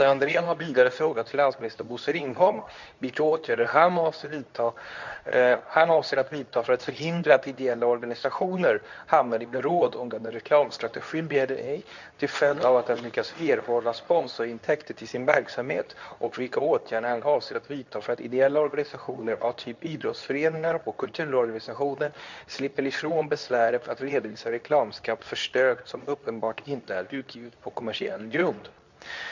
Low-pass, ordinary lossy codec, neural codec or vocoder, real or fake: 7.2 kHz; none; codec, 24 kHz, 0.9 kbps, WavTokenizer, medium speech release version 2; fake